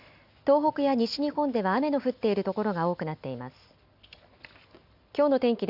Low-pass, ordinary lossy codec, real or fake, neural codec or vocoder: 5.4 kHz; AAC, 48 kbps; real; none